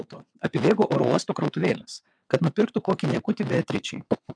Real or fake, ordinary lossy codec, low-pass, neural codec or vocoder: fake; MP3, 96 kbps; 9.9 kHz; vocoder, 22.05 kHz, 80 mel bands, WaveNeXt